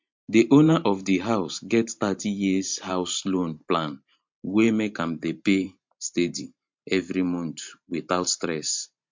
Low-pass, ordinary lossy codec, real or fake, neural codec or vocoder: 7.2 kHz; MP3, 48 kbps; fake; vocoder, 44.1 kHz, 128 mel bands every 512 samples, BigVGAN v2